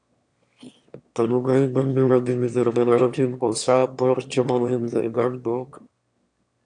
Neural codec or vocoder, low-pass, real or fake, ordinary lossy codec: autoencoder, 22.05 kHz, a latent of 192 numbers a frame, VITS, trained on one speaker; 9.9 kHz; fake; AAC, 64 kbps